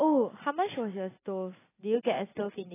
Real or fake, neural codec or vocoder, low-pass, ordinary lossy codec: real; none; 3.6 kHz; AAC, 16 kbps